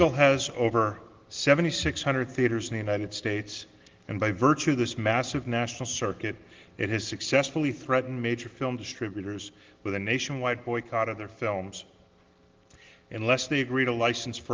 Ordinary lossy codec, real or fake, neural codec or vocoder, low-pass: Opus, 16 kbps; real; none; 7.2 kHz